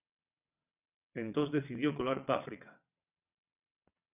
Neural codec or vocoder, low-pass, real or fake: vocoder, 22.05 kHz, 80 mel bands, WaveNeXt; 3.6 kHz; fake